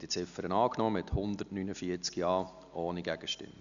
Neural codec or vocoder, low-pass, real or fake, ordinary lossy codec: none; 7.2 kHz; real; none